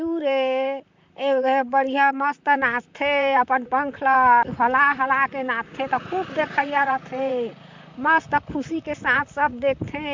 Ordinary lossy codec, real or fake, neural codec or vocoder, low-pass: MP3, 64 kbps; fake; vocoder, 44.1 kHz, 128 mel bands, Pupu-Vocoder; 7.2 kHz